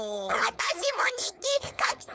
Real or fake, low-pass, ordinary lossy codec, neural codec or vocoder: fake; none; none; codec, 16 kHz, 4.8 kbps, FACodec